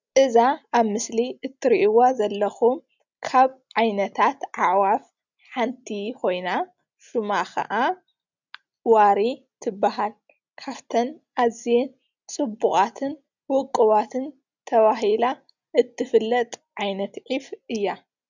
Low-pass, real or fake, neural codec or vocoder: 7.2 kHz; real; none